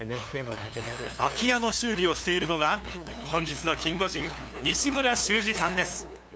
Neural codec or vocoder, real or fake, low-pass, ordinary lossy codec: codec, 16 kHz, 2 kbps, FunCodec, trained on LibriTTS, 25 frames a second; fake; none; none